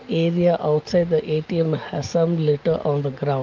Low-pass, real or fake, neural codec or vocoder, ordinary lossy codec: 7.2 kHz; real; none; Opus, 24 kbps